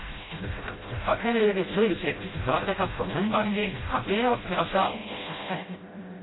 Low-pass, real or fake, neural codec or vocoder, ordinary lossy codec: 7.2 kHz; fake; codec, 16 kHz, 0.5 kbps, FreqCodec, smaller model; AAC, 16 kbps